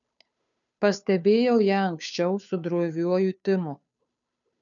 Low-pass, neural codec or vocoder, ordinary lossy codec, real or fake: 7.2 kHz; codec, 16 kHz, 2 kbps, FunCodec, trained on Chinese and English, 25 frames a second; MP3, 96 kbps; fake